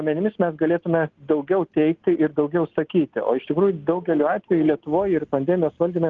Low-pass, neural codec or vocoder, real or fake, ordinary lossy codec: 7.2 kHz; none; real; Opus, 16 kbps